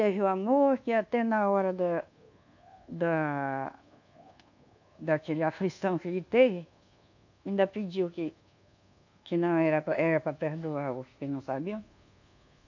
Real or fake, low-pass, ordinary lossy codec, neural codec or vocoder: fake; 7.2 kHz; none; codec, 24 kHz, 1.2 kbps, DualCodec